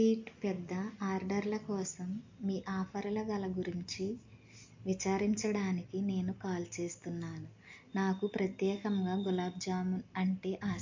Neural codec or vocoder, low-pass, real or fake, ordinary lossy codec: none; 7.2 kHz; real; MP3, 48 kbps